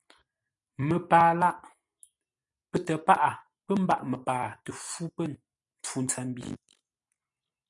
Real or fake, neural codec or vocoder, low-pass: real; none; 10.8 kHz